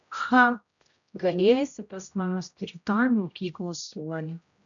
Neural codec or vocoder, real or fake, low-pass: codec, 16 kHz, 0.5 kbps, X-Codec, HuBERT features, trained on general audio; fake; 7.2 kHz